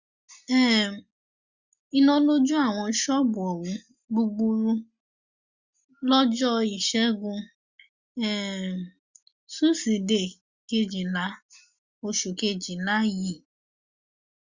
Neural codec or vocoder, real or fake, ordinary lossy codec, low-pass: none; real; Opus, 64 kbps; 7.2 kHz